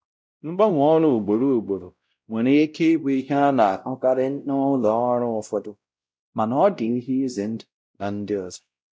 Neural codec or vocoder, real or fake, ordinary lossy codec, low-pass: codec, 16 kHz, 0.5 kbps, X-Codec, WavLM features, trained on Multilingual LibriSpeech; fake; none; none